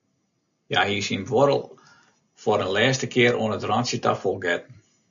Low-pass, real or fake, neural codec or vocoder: 7.2 kHz; real; none